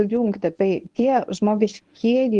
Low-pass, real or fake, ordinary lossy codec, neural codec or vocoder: 10.8 kHz; fake; Opus, 24 kbps; codec, 24 kHz, 0.9 kbps, WavTokenizer, medium speech release version 1